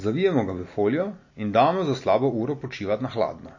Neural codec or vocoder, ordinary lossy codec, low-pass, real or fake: none; MP3, 32 kbps; 7.2 kHz; real